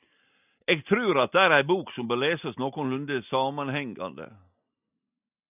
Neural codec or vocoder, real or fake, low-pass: none; real; 3.6 kHz